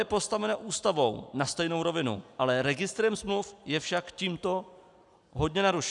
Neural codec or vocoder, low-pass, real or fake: none; 10.8 kHz; real